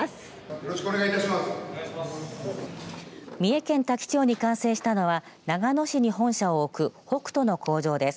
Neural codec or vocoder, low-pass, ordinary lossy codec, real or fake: none; none; none; real